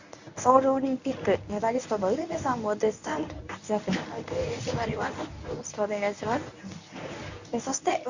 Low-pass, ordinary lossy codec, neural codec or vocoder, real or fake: 7.2 kHz; Opus, 64 kbps; codec, 24 kHz, 0.9 kbps, WavTokenizer, medium speech release version 1; fake